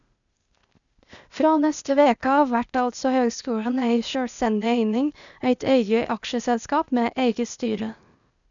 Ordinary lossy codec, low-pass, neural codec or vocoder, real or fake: none; 7.2 kHz; codec, 16 kHz, 0.8 kbps, ZipCodec; fake